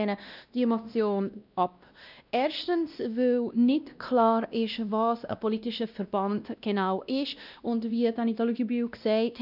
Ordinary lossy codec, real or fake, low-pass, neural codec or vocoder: none; fake; 5.4 kHz; codec, 16 kHz, 1 kbps, X-Codec, WavLM features, trained on Multilingual LibriSpeech